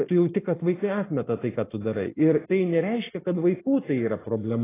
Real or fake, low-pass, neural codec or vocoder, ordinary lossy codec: real; 3.6 kHz; none; AAC, 16 kbps